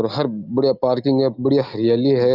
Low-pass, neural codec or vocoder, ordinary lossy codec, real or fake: 5.4 kHz; none; Opus, 24 kbps; real